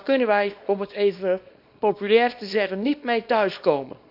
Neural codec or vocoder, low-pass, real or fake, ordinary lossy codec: codec, 24 kHz, 0.9 kbps, WavTokenizer, small release; 5.4 kHz; fake; none